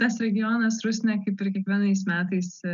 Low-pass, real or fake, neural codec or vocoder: 7.2 kHz; real; none